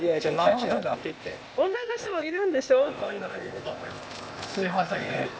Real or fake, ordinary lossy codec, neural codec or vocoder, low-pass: fake; none; codec, 16 kHz, 0.8 kbps, ZipCodec; none